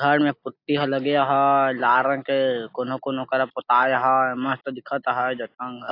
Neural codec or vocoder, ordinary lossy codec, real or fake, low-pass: none; AAC, 32 kbps; real; 5.4 kHz